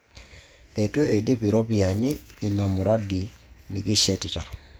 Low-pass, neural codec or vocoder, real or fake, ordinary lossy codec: none; codec, 44.1 kHz, 2.6 kbps, SNAC; fake; none